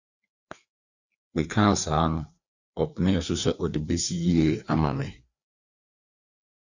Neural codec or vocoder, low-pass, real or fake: codec, 16 kHz, 2 kbps, FreqCodec, larger model; 7.2 kHz; fake